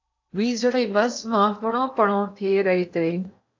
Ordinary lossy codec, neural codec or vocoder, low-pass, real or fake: AAC, 48 kbps; codec, 16 kHz in and 24 kHz out, 0.8 kbps, FocalCodec, streaming, 65536 codes; 7.2 kHz; fake